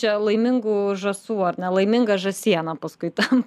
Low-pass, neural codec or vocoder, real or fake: 14.4 kHz; none; real